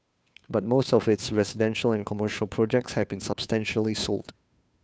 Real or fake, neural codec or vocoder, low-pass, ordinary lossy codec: fake; codec, 16 kHz, 2 kbps, FunCodec, trained on Chinese and English, 25 frames a second; none; none